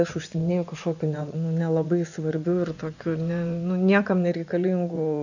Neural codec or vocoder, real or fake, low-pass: vocoder, 24 kHz, 100 mel bands, Vocos; fake; 7.2 kHz